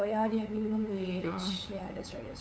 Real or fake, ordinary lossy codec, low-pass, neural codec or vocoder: fake; none; none; codec, 16 kHz, 8 kbps, FunCodec, trained on LibriTTS, 25 frames a second